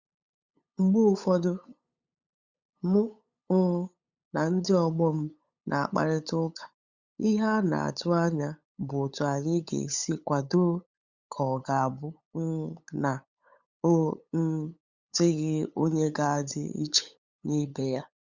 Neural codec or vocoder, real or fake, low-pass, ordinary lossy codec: codec, 16 kHz, 8 kbps, FunCodec, trained on LibriTTS, 25 frames a second; fake; 7.2 kHz; Opus, 64 kbps